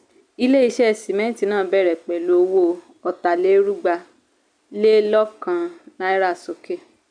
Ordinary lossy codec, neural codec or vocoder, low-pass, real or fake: none; none; 9.9 kHz; real